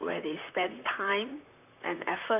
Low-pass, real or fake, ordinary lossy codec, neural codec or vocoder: 3.6 kHz; fake; none; vocoder, 44.1 kHz, 128 mel bands, Pupu-Vocoder